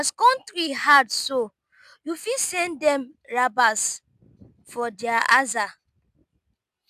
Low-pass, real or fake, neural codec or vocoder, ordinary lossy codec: 14.4 kHz; real; none; none